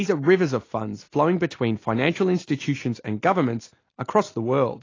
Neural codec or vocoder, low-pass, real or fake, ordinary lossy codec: none; 7.2 kHz; real; AAC, 32 kbps